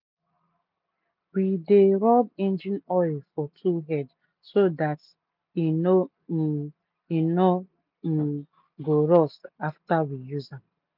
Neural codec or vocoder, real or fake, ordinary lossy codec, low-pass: none; real; none; 5.4 kHz